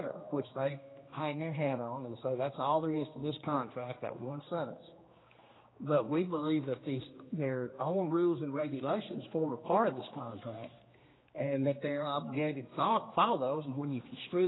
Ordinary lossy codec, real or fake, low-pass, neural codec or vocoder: AAC, 16 kbps; fake; 7.2 kHz; codec, 16 kHz, 2 kbps, X-Codec, HuBERT features, trained on general audio